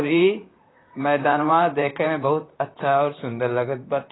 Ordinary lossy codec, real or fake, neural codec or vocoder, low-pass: AAC, 16 kbps; fake; vocoder, 44.1 kHz, 128 mel bands, Pupu-Vocoder; 7.2 kHz